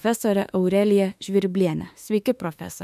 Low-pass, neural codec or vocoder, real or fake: 14.4 kHz; autoencoder, 48 kHz, 32 numbers a frame, DAC-VAE, trained on Japanese speech; fake